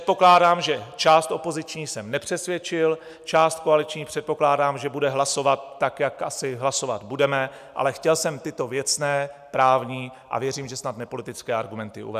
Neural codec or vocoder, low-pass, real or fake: none; 14.4 kHz; real